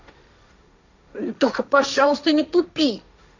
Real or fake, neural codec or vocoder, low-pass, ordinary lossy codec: fake; codec, 16 kHz, 1.1 kbps, Voila-Tokenizer; 7.2 kHz; none